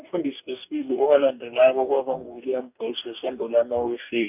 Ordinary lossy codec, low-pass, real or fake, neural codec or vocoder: none; 3.6 kHz; fake; codec, 44.1 kHz, 2.6 kbps, DAC